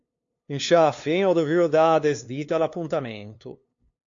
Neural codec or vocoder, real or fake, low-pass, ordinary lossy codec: codec, 16 kHz, 2 kbps, FunCodec, trained on LibriTTS, 25 frames a second; fake; 7.2 kHz; AAC, 48 kbps